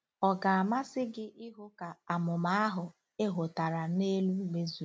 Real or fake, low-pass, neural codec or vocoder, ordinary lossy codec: real; none; none; none